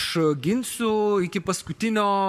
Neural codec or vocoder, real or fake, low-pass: codec, 44.1 kHz, 7.8 kbps, Pupu-Codec; fake; 14.4 kHz